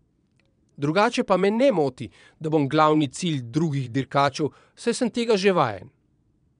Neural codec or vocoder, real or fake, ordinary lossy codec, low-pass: vocoder, 22.05 kHz, 80 mel bands, Vocos; fake; none; 9.9 kHz